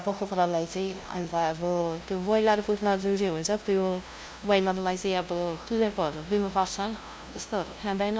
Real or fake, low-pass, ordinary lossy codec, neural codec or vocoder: fake; none; none; codec, 16 kHz, 0.5 kbps, FunCodec, trained on LibriTTS, 25 frames a second